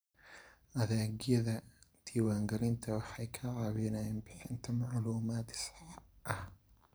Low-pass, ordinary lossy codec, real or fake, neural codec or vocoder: none; none; fake; vocoder, 44.1 kHz, 128 mel bands every 512 samples, BigVGAN v2